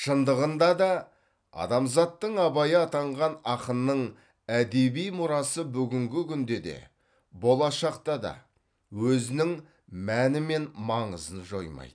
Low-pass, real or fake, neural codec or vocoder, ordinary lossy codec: 9.9 kHz; real; none; none